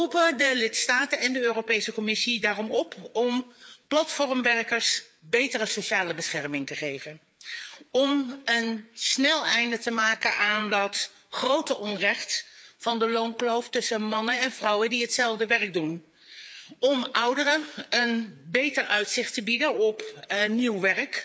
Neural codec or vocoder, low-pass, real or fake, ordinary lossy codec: codec, 16 kHz, 4 kbps, FreqCodec, larger model; none; fake; none